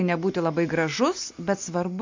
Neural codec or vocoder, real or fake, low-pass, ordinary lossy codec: none; real; 7.2 kHz; MP3, 48 kbps